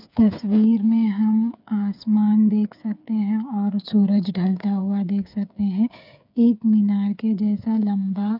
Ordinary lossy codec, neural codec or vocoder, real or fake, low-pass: none; codec, 16 kHz, 8 kbps, FreqCodec, smaller model; fake; 5.4 kHz